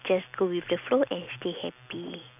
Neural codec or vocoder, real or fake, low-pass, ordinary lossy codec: none; real; 3.6 kHz; none